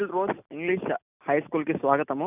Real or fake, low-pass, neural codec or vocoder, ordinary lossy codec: real; 3.6 kHz; none; none